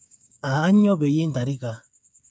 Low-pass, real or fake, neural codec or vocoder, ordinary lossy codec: none; fake; codec, 16 kHz, 8 kbps, FreqCodec, smaller model; none